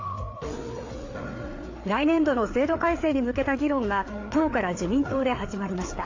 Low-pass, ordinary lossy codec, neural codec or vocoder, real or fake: 7.2 kHz; AAC, 48 kbps; codec, 16 kHz, 4 kbps, FreqCodec, larger model; fake